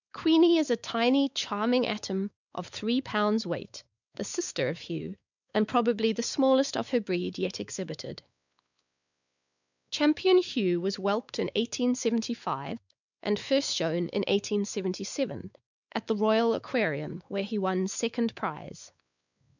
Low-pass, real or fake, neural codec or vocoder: 7.2 kHz; fake; codec, 16 kHz, 4 kbps, X-Codec, WavLM features, trained on Multilingual LibriSpeech